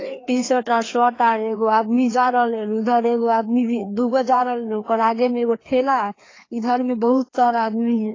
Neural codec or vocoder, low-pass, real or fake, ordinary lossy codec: codec, 16 kHz, 2 kbps, FreqCodec, larger model; 7.2 kHz; fake; AAC, 32 kbps